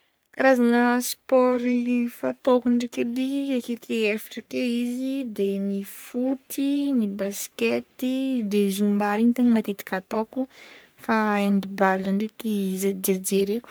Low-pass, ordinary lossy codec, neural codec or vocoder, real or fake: none; none; codec, 44.1 kHz, 3.4 kbps, Pupu-Codec; fake